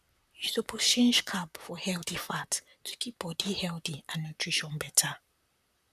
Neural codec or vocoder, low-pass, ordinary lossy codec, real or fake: vocoder, 44.1 kHz, 128 mel bands, Pupu-Vocoder; 14.4 kHz; none; fake